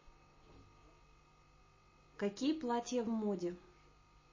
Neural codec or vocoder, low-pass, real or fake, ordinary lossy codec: none; 7.2 kHz; real; MP3, 32 kbps